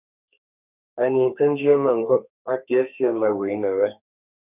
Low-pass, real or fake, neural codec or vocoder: 3.6 kHz; fake; codec, 32 kHz, 1.9 kbps, SNAC